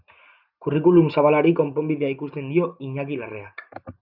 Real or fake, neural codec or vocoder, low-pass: real; none; 5.4 kHz